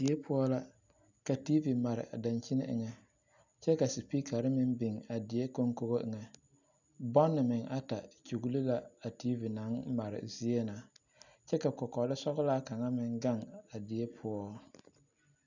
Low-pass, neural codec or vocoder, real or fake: 7.2 kHz; none; real